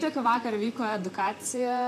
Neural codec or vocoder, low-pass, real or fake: vocoder, 44.1 kHz, 128 mel bands, Pupu-Vocoder; 14.4 kHz; fake